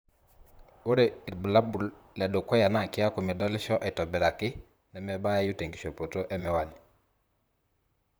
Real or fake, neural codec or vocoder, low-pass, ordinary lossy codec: fake; vocoder, 44.1 kHz, 128 mel bands, Pupu-Vocoder; none; none